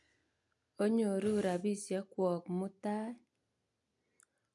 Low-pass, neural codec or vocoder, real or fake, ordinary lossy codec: 10.8 kHz; none; real; none